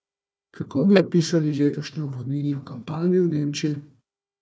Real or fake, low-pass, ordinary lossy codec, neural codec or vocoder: fake; none; none; codec, 16 kHz, 1 kbps, FunCodec, trained on Chinese and English, 50 frames a second